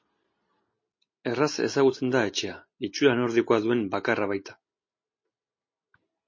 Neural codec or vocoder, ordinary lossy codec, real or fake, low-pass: none; MP3, 32 kbps; real; 7.2 kHz